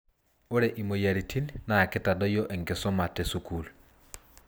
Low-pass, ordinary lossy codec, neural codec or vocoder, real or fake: none; none; none; real